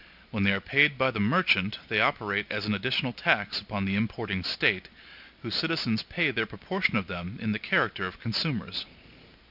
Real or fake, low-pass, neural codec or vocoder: real; 5.4 kHz; none